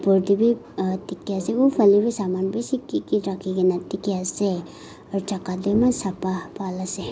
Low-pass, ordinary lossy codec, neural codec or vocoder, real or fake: none; none; none; real